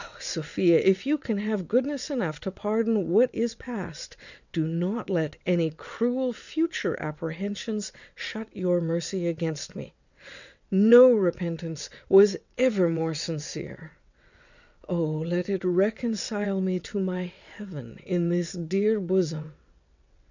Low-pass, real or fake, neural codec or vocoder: 7.2 kHz; fake; vocoder, 22.05 kHz, 80 mel bands, WaveNeXt